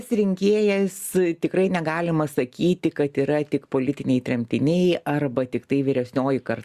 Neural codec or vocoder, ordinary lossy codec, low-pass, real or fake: none; Opus, 64 kbps; 14.4 kHz; real